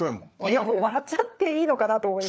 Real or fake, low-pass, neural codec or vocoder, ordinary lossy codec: fake; none; codec, 16 kHz, 4 kbps, FunCodec, trained on LibriTTS, 50 frames a second; none